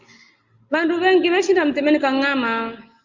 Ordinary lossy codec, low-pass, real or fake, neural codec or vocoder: Opus, 32 kbps; 7.2 kHz; real; none